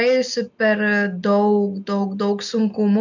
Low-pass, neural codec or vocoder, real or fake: 7.2 kHz; none; real